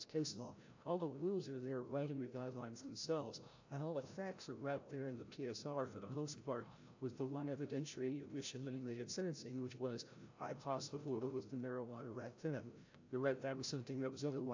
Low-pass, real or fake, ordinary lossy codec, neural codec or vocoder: 7.2 kHz; fake; AAC, 48 kbps; codec, 16 kHz, 0.5 kbps, FreqCodec, larger model